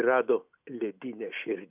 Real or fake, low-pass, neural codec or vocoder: real; 3.6 kHz; none